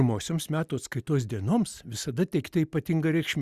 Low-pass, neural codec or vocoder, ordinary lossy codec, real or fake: 14.4 kHz; none; Opus, 64 kbps; real